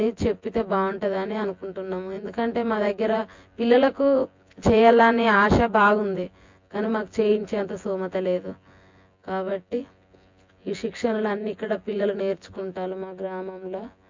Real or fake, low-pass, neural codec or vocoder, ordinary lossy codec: fake; 7.2 kHz; vocoder, 24 kHz, 100 mel bands, Vocos; MP3, 48 kbps